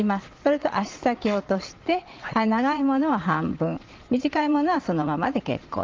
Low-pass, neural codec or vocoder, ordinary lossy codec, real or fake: 7.2 kHz; vocoder, 22.05 kHz, 80 mel bands, Vocos; Opus, 32 kbps; fake